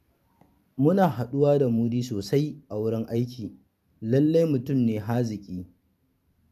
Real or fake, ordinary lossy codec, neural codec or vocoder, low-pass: fake; none; vocoder, 44.1 kHz, 128 mel bands every 512 samples, BigVGAN v2; 14.4 kHz